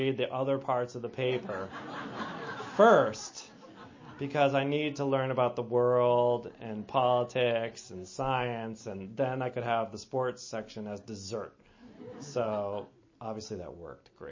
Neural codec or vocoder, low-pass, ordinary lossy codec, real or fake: none; 7.2 kHz; MP3, 32 kbps; real